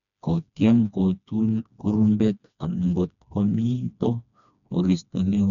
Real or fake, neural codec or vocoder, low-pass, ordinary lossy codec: fake; codec, 16 kHz, 2 kbps, FreqCodec, smaller model; 7.2 kHz; none